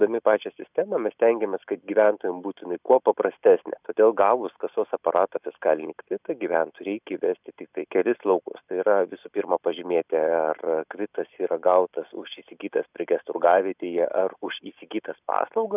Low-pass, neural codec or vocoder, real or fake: 3.6 kHz; none; real